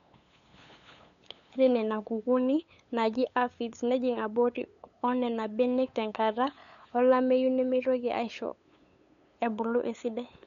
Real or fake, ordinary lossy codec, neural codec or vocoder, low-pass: fake; none; codec, 16 kHz, 8 kbps, FunCodec, trained on LibriTTS, 25 frames a second; 7.2 kHz